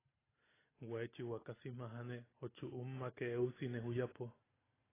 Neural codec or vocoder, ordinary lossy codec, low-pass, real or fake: none; AAC, 16 kbps; 3.6 kHz; real